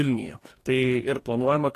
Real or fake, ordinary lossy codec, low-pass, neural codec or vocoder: fake; AAC, 48 kbps; 14.4 kHz; codec, 44.1 kHz, 2.6 kbps, DAC